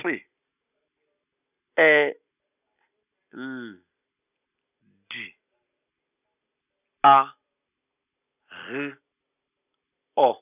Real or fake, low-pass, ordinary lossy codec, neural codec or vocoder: real; 3.6 kHz; none; none